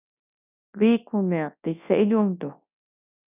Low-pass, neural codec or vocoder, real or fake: 3.6 kHz; codec, 24 kHz, 0.9 kbps, WavTokenizer, large speech release; fake